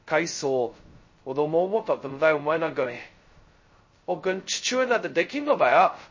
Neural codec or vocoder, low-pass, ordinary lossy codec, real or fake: codec, 16 kHz, 0.2 kbps, FocalCodec; 7.2 kHz; MP3, 32 kbps; fake